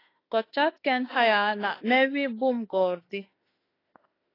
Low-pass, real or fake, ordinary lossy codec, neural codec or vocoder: 5.4 kHz; fake; AAC, 24 kbps; autoencoder, 48 kHz, 32 numbers a frame, DAC-VAE, trained on Japanese speech